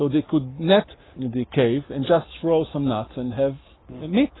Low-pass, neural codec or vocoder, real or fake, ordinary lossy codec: 7.2 kHz; codec, 44.1 kHz, 7.8 kbps, Pupu-Codec; fake; AAC, 16 kbps